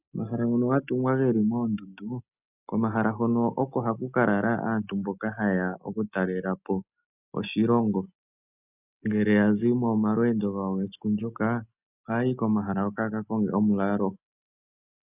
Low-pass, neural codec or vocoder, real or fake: 3.6 kHz; none; real